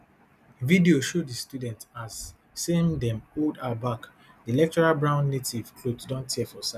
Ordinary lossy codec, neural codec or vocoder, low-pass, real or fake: none; none; 14.4 kHz; real